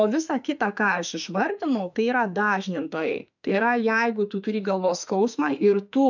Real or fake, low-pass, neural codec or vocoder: fake; 7.2 kHz; autoencoder, 48 kHz, 32 numbers a frame, DAC-VAE, trained on Japanese speech